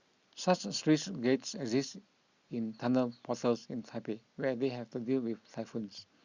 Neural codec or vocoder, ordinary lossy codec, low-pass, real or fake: none; Opus, 64 kbps; 7.2 kHz; real